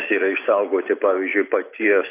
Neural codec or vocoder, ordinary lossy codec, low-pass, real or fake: none; AAC, 24 kbps; 3.6 kHz; real